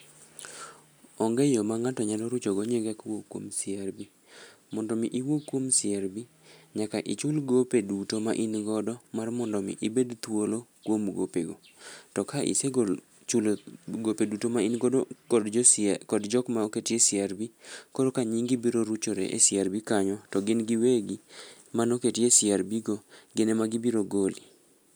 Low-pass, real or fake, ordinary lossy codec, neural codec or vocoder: none; real; none; none